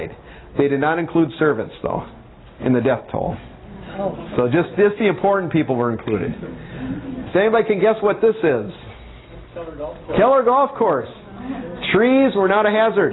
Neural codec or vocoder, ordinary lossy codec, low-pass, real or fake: none; AAC, 16 kbps; 7.2 kHz; real